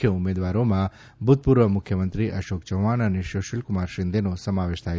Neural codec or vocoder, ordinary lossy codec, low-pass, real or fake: none; none; none; real